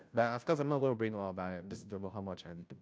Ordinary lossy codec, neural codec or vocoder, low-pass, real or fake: none; codec, 16 kHz, 0.5 kbps, FunCodec, trained on Chinese and English, 25 frames a second; none; fake